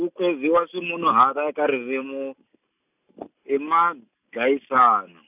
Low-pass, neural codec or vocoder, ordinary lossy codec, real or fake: 3.6 kHz; none; none; real